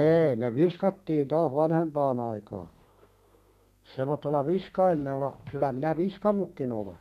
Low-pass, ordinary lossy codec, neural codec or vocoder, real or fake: 14.4 kHz; none; codec, 32 kHz, 1.9 kbps, SNAC; fake